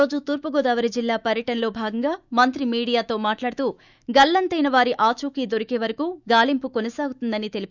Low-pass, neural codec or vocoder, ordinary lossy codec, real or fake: 7.2 kHz; autoencoder, 48 kHz, 128 numbers a frame, DAC-VAE, trained on Japanese speech; none; fake